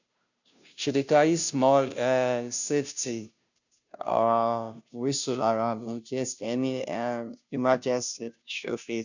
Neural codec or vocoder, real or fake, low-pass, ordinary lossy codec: codec, 16 kHz, 0.5 kbps, FunCodec, trained on Chinese and English, 25 frames a second; fake; 7.2 kHz; none